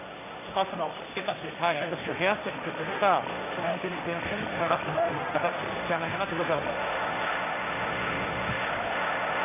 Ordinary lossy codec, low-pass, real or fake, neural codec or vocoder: none; 3.6 kHz; fake; codec, 16 kHz, 1.1 kbps, Voila-Tokenizer